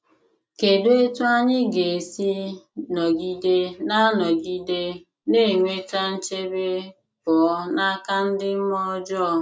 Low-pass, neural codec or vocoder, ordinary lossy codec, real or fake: none; none; none; real